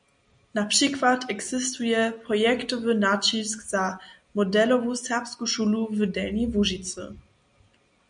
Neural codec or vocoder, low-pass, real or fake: none; 9.9 kHz; real